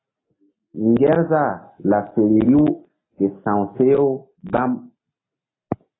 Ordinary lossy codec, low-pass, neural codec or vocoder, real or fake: AAC, 16 kbps; 7.2 kHz; none; real